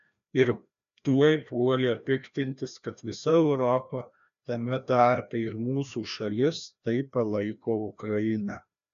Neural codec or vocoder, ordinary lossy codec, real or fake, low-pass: codec, 16 kHz, 1 kbps, FreqCodec, larger model; MP3, 96 kbps; fake; 7.2 kHz